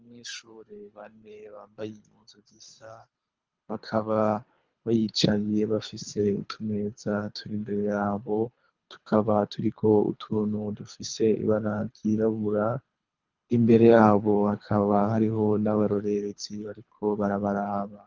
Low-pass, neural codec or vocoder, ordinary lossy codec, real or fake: 7.2 kHz; codec, 24 kHz, 3 kbps, HILCodec; Opus, 24 kbps; fake